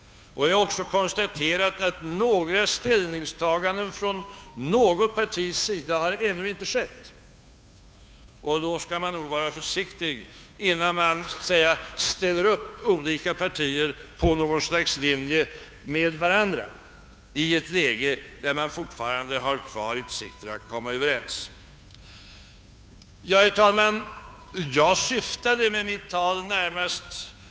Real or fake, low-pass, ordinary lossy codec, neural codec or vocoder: fake; none; none; codec, 16 kHz, 2 kbps, FunCodec, trained on Chinese and English, 25 frames a second